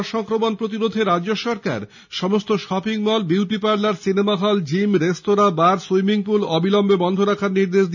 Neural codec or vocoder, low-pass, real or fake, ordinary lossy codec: none; 7.2 kHz; real; none